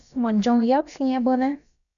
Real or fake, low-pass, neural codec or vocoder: fake; 7.2 kHz; codec, 16 kHz, about 1 kbps, DyCAST, with the encoder's durations